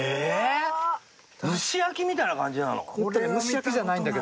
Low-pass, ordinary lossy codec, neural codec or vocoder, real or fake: none; none; none; real